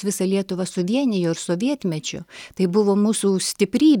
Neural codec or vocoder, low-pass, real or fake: none; 19.8 kHz; real